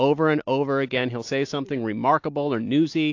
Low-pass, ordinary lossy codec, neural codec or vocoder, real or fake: 7.2 kHz; AAC, 48 kbps; none; real